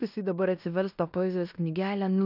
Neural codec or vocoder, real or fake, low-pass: codec, 16 kHz in and 24 kHz out, 0.9 kbps, LongCat-Audio-Codec, fine tuned four codebook decoder; fake; 5.4 kHz